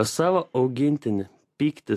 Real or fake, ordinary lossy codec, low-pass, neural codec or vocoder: real; AAC, 64 kbps; 14.4 kHz; none